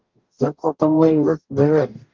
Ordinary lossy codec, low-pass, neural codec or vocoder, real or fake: Opus, 24 kbps; 7.2 kHz; codec, 44.1 kHz, 0.9 kbps, DAC; fake